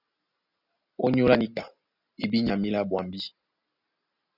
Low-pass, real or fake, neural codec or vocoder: 5.4 kHz; real; none